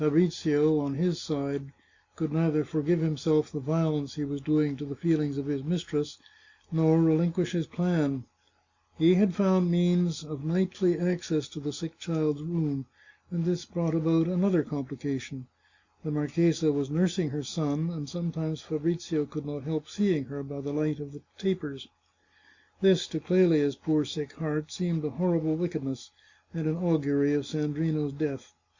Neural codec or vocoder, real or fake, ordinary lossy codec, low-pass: none; real; Opus, 64 kbps; 7.2 kHz